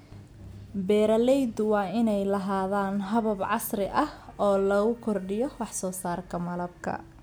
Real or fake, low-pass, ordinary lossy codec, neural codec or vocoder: real; none; none; none